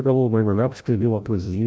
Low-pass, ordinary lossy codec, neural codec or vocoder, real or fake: none; none; codec, 16 kHz, 0.5 kbps, FreqCodec, larger model; fake